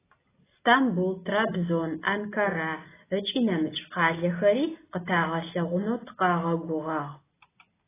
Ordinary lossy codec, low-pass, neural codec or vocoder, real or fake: AAC, 16 kbps; 3.6 kHz; none; real